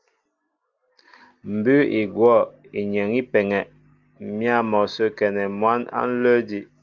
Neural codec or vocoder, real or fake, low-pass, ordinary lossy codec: none; real; 7.2 kHz; Opus, 32 kbps